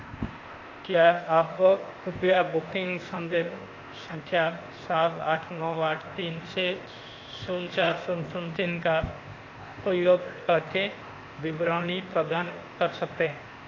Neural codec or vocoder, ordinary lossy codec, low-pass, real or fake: codec, 16 kHz, 0.8 kbps, ZipCodec; AAC, 32 kbps; 7.2 kHz; fake